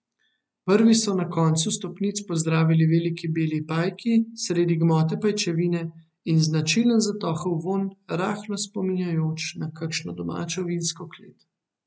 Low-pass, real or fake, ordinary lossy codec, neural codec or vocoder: none; real; none; none